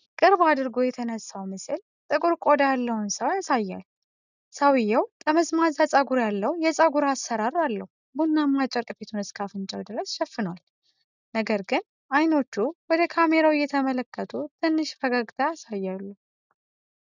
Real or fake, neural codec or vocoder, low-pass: real; none; 7.2 kHz